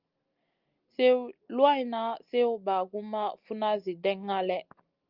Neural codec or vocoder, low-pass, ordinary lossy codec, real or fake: none; 5.4 kHz; Opus, 32 kbps; real